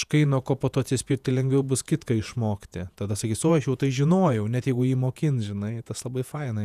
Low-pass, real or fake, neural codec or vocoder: 14.4 kHz; fake; vocoder, 48 kHz, 128 mel bands, Vocos